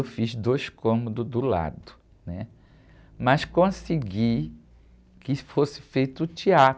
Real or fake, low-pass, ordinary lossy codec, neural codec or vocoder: real; none; none; none